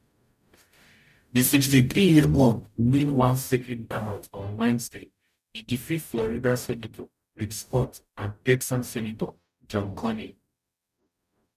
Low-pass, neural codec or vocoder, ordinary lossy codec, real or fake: 14.4 kHz; codec, 44.1 kHz, 0.9 kbps, DAC; none; fake